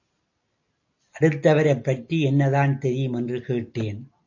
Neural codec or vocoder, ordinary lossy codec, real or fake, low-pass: none; AAC, 48 kbps; real; 7.2 kHz